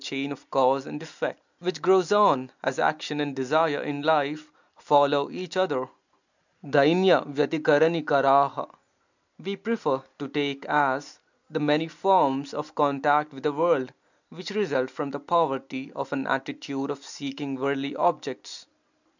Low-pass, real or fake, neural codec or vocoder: 7.2 kHz; real; none